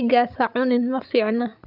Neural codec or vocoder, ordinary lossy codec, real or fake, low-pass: codec, 16 kHz, 16 kbps, FreqCodec, larger model; AAC, 48 kbps; fake; 5.4 kHz